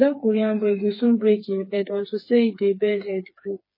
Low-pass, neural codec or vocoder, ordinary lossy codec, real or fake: 5.4 kHz; codec, 16 kHz, 4 kbps, FreqCodec, smaller model; MP3, 24 kbps; fake